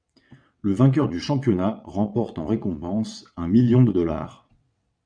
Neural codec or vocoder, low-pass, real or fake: vocoder, 22.05 kHz, 80 mel bands, WaveNeXt; 9.9 kHz; fake